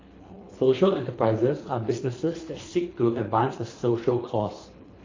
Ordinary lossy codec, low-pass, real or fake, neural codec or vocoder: AAC, 32 kbps; 7.2 kHz; fake; codec, 24 kHz, 3 kbps, HILCodec